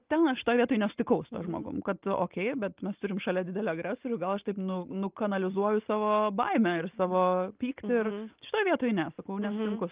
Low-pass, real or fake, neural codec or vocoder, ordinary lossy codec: 3.6 kHz; real; none; Opus, 32 kbps